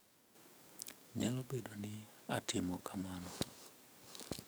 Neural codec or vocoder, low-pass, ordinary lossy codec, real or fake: codec, 44.1 kHz, 7.8 kbps, DAC; none; none; fake